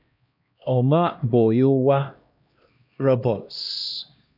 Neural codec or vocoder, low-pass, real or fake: codec, 16 kHz, 1 kbps, X-Codec, HuBERT features, trained on LibriSpeech; 5.4 kHz; fake